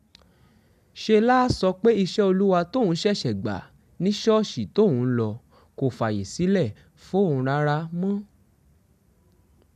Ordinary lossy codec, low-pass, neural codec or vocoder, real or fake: MP3, 96 kbps; 14.4 kHz; none; real